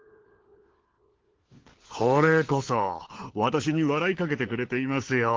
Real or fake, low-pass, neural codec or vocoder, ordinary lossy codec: fake; 7.2 kHz; codec, 16 kHz, 4 kbps, FunCodec, trained on LibriTTS, 50 frames a second; Opus, 16 kbps